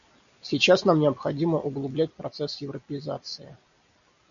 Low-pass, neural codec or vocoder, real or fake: 7.2 kHz; none; real